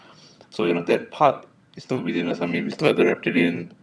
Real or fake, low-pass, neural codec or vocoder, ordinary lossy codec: fake; none; vocoder, 22.05 kHz, 80 mel bands, HiFi-GAN; none